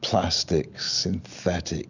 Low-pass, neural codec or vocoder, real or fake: 7.2 kHz; none; real